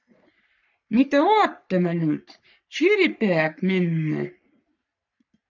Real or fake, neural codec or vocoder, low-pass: fake; codec, 44.1 kHz, 3.4 kbps, Pupu-Codec; 7.2 kHz